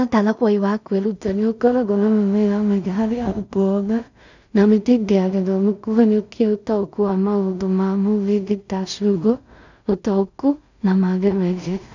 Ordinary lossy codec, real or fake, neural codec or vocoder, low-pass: none; fake; codec, 16 kHz in and 24 kHz out, 0.4 kbps, LongCat-Audio-Codec, two codebook decoder; 7.2 kHz